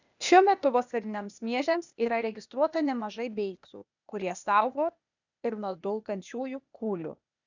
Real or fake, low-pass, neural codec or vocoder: fake; 7.2 kHz; codec, 16 kHz, 0.8 kbps, ZipCodec